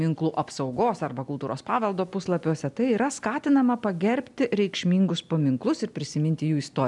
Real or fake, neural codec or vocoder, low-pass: real; none; 10.8 kHz